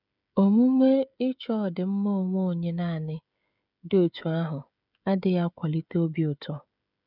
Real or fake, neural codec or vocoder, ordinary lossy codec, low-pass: fake; codec, 16 kHz, 16 kbps, FreqCodec, smaller model; none; 5.4 kHz